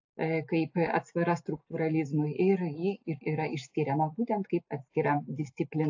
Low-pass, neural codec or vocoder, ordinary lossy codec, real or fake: 7.2 kHz; none; AAC, 48 kbps; real